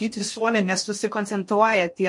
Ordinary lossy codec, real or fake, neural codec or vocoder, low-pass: MP3, 48 kbps; fake; codec, 16 kHz in and 24 kHz out, 0.6 kbps, FocalCodec, streaming, 2048 codes; 10.8 kHz